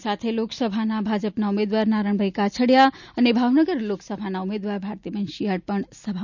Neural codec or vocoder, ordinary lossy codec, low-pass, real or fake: none; none; 7.2 kHz; real